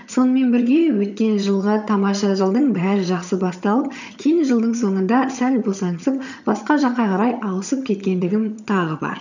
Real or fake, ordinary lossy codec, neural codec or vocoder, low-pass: fake; none; vocoder, 22.05 kHz, 80 mel bands, HiFi-GAN; 7.2 kHz